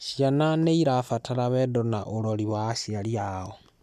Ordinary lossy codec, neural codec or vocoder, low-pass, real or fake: none; none; 14.4 kHz; real